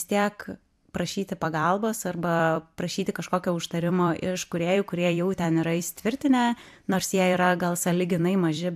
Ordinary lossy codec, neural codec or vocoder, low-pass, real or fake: AAC, 96 kbps; vocoder, 44.1 kHz, 128 mel bands every 256 samples, BigVGAN v2; 14.4 kHz; fake